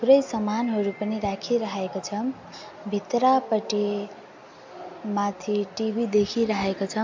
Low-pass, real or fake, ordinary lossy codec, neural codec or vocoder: 7.2 kHz; real; MP3, 48 kbps; none